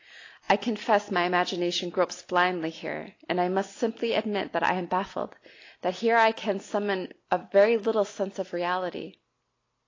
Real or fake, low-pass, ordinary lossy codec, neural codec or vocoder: real; 7.2 kHz; AAC, 32 kbps; none